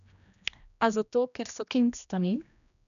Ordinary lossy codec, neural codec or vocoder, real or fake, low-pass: none; codec, 16 kHz, 1 kbps, X-Codec, HuBERT features, trained on general audio; fake; 7.2 kHz